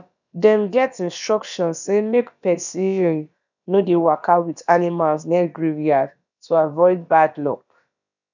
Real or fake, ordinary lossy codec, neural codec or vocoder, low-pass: fake; none; codec, 16 kHz, about 1 kbps, DyCAST, with the encoder's durations; 7.2 kHz